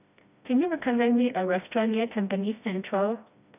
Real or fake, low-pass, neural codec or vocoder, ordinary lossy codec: fake; 3.6 kHz; codec, 16 kHz, 1 kbps, FreqCodec, smaller model; none